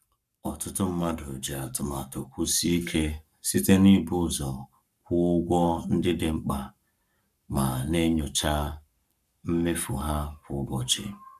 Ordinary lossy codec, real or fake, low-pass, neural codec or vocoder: none; fake; 14.4 kHz; codec, 44.1 kHz, 7.8 kbps, Pupu-Codec